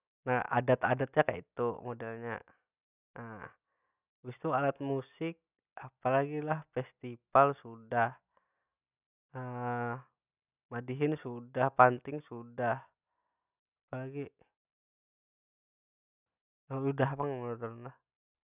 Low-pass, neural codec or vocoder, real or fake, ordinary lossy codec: 3.6 kHz; none; real; none